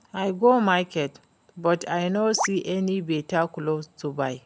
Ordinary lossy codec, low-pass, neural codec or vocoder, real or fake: none; none; none; real